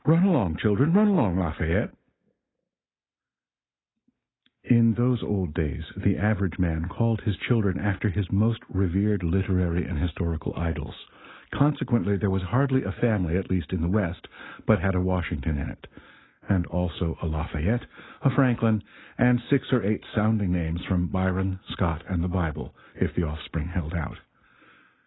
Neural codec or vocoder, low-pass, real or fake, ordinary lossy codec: none; 7.2 kHz; real; AAC, 16 kbps